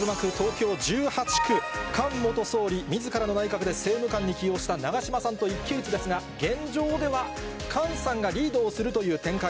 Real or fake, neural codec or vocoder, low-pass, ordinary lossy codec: real; none; none; none